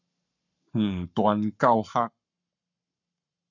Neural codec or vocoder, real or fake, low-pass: codec, 44.1 kHz, 7.8 kbps, Pupu-Codec; fake; 7.2 kHz